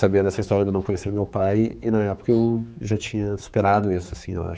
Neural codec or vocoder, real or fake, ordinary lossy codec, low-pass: codec, 16 kHz, 4 kbps, X-Codec, HuBERT features, trained on general audio; fake; none; none